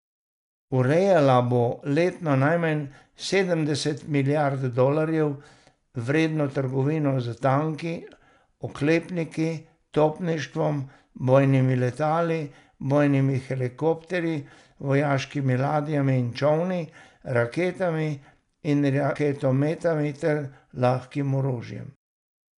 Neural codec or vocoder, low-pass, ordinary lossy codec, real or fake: none; 10.8 kHz; none; real